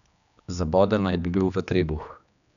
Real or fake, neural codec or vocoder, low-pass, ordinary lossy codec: fake; codec, 16 kHz, 2 kbps, X-Codec, HuBERT features, trained on general audio; 7.2 kHz; MP3, 96 kbps